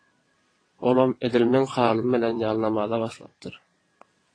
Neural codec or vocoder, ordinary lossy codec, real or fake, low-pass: vocoder, 22.05 kHz, 80 mel bands, WaveNeXt; AAC, 32 kbps; fake; 9.9 kHz